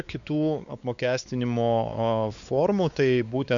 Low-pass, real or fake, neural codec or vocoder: 7.2 kHz; fake; codec, 16 kHz, 4 kbps, X-Codec, WavLM features, trained on Multilingual LibriSpeech